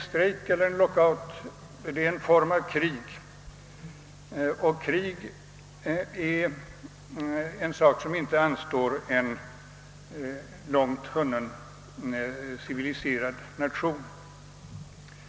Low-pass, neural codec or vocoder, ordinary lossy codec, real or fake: none; none; none; real